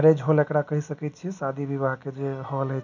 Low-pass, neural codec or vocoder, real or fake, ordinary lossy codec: 7.2 kHz; none; real; none